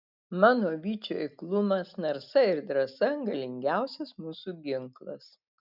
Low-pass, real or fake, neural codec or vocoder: 5.4 kHz; real; none